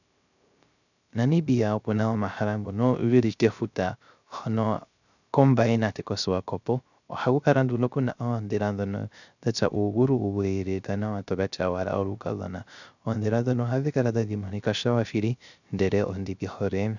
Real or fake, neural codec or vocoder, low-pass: fake; codec, 16 kHz, 0.3 kbps, FocalCodec; 7.2 kHz